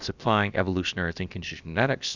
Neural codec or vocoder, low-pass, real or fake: codec, 16 kHz, about 1 kbps, DyCAST, with the encoder's durations; 7.2 kHz; fake